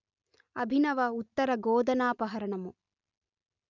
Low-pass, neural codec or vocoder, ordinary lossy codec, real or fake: 7.2 kHz; none; none; real